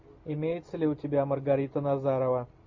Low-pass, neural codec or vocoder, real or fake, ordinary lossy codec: 7.2 kHz; none; real; MP3, 48 kbps